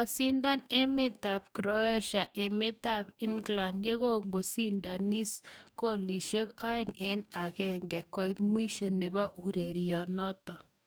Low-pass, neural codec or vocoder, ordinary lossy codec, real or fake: none; codec, 44.1 kHz, 2.6 kbps, DAC; none; fake